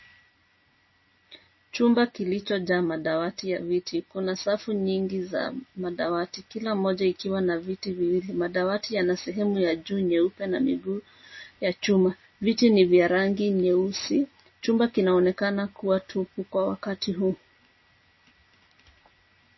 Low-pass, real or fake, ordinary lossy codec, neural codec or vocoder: 7.2 kHz; real; MP3, 24 kbps; none